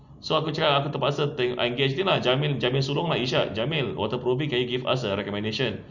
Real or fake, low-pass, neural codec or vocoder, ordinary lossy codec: real; 7.2 kHz; none; none